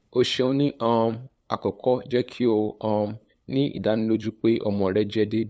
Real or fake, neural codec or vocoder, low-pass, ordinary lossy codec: fake; codec, 16 kHz, 8 kbps, FunCodec, trained on LibriTTS, 25 frames a second; none; none